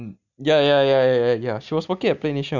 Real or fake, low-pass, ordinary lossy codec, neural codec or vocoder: real; 7.2 kHz; none; none